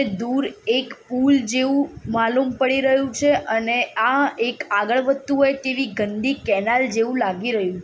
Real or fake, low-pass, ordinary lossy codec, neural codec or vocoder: real; none; none; none